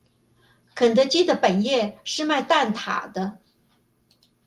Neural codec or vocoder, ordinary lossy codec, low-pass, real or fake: none; Opus, 32 kbps; 14.4 kHz; real